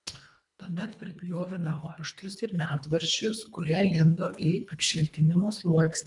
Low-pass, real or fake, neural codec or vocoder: 10.8 kHz; fake; codec, 24 kHz, 1.5 kbps, HILCodec